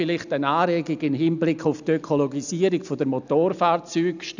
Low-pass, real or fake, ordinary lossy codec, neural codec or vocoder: 7.2 kHz; real; none; none